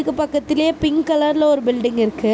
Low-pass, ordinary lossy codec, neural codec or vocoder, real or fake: none; none; none; real